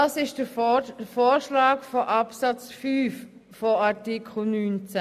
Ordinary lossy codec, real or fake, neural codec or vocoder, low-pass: none; real; none; 14.4 kHz